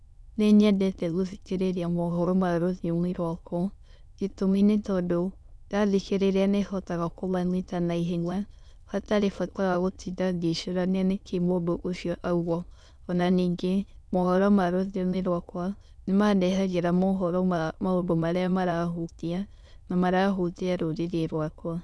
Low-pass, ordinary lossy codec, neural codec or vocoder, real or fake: none; none; autoencoder, 22.05 kHz, a latent of 192 numbers a frame, VITS, trained on many speakers; fake